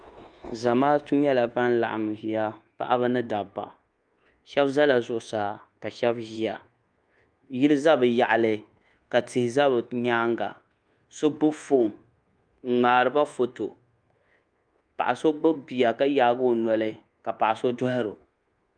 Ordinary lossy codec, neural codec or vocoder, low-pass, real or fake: Opus, 32 kbps; codec, 24 kHz, 1.2 kbps, DualCodec; 9.9 kHz; fake